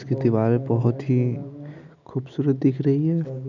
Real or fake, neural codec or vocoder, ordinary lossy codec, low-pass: real; none; none; 7.2 kHz